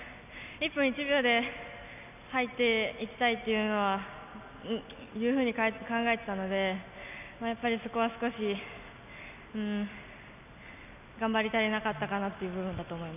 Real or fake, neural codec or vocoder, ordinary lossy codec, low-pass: real; none; none; 3.6 kHz